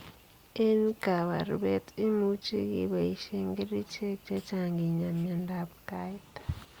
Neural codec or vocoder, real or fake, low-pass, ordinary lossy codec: none; real; 19.8 kHz; Opus, 64 kbps